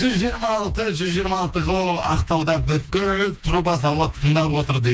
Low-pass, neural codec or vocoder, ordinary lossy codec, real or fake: none; codec, 16 kHz, 2 kbps, FreqCodec, smaller model; none; fake